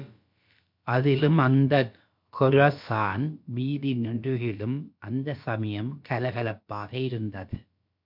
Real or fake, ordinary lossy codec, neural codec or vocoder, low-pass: fake; MP3, 48 kbps; codec, 16 kHz, about 1 kbps, DyCAST, with the encoder's durations; 5.4 kHz